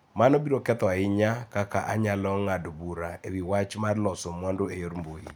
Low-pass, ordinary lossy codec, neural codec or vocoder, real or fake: none; none; none; real